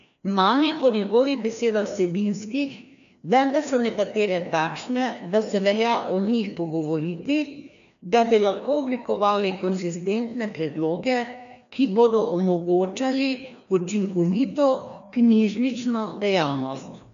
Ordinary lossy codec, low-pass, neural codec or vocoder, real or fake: none; 7.2 kHz; codec, 16 kHz, 1 kbps, FreqCodec, larger model; fake